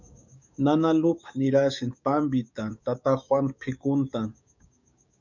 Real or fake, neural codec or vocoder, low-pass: fake; codec, 16 kHz, 6 kbps, DAC; 7.2 kHz